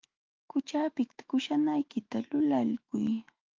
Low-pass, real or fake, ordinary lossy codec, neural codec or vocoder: 7.2 kHz; fake; Opus, 32 kbps; autoencoder, 48 kHz, 128 numbers a frame, DAC-VAE, trained on Japanese speech